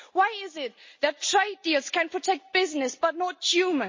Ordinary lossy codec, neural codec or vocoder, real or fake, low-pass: MP3, 32 kbps; none; real; 7.2 kHz